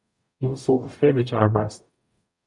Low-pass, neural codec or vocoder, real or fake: 10.8 kHz; codec, 44.1 kHz, 0.9 kbps, DAC; fake